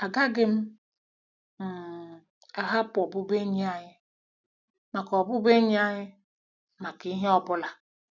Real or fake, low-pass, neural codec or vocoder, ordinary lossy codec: real; 7.2 kHz; none; none